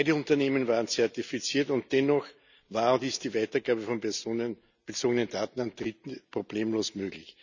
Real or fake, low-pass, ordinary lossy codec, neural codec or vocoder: real; 7.2 kHz; none; none